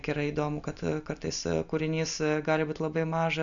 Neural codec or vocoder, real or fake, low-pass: none; real; 7.2 kHz